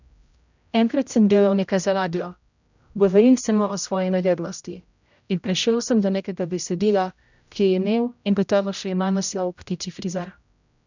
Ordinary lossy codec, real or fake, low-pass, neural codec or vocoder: none; fake; 7.2 kHz; codec, 16 kHz, 0.5 kbps, X-Codec, HuBERT features, trained on general audio